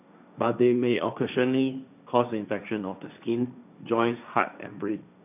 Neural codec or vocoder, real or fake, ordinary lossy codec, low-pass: codec, 16 kHz, 1.1 kbps, Voila-Tokenizer; fake; none; 3.6 kHz